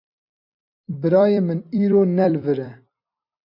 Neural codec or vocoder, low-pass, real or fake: vocoder, 44.1 kHz, 128 mel bands every 256 samples, BigVGAN v2; 5.4 kHz; fake